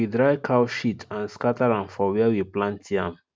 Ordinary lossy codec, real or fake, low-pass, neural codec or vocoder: none; real; none; none